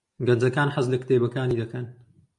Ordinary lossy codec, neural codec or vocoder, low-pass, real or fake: MP3, 64 kbps; none; 10.8 kHz; real